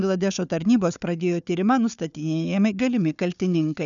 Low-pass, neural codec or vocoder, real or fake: 7.2 kHz; codec, 16 kHz, 4 kbps, FunCodec, trained on Chinese and English, 50 frames a second; fake